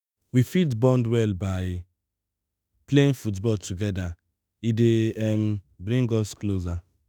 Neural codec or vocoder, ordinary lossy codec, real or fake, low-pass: autoencoder, 48 kHz, 32 numbers a frame, DAC-VAE, trained on Japanese speech; none; fake; none